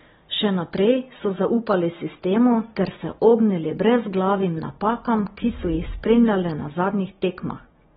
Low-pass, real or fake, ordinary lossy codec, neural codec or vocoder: 7.2 kHz; real; AAC, 16 kbps; none